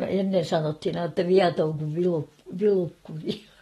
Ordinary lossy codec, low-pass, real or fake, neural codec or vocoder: AAC, 32 kbps; 19.8 kHz; real; none